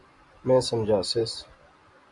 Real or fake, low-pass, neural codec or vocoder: real; 10.8 kHz; none